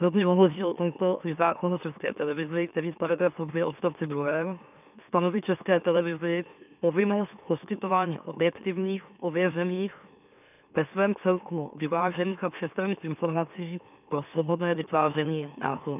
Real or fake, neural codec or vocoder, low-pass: fake; autoencoder, 44.1 kHz, a latent of 192 numbers a frame, MeloTTS; 3.6 kHz